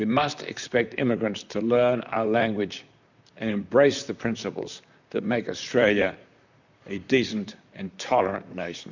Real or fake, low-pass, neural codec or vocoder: fake; 7.2 kHz; vocoder, 44.1 kHz, 128 mel bands, Pupu-Vocoder